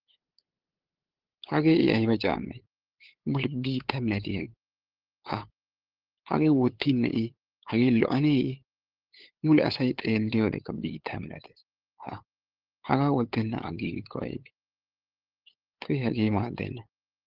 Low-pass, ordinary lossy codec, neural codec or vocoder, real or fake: 5.4 kHz; Opus, 16 kbps; codec, 16 kHz, 8 kbps, FunCodec, trained on LibriTTS, 25 frames a second; fake